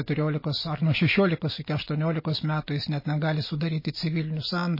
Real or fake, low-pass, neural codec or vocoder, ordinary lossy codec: real; 5.4 kHz; none; MP3, 24 kbps